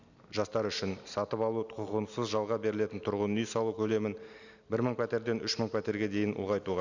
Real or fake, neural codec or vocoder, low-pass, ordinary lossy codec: real; none; 7.2 kHz; none